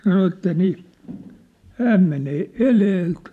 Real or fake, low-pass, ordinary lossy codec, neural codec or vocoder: fake; 14.4 kHz; none; vocoder, 44.1 kHz, 128 mel bands every 512 samples, BigVGAN v2